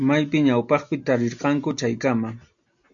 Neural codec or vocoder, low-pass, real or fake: none; 7.2 kHz; real